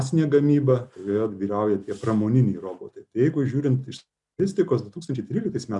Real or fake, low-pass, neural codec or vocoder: real; 10.8 kHz; none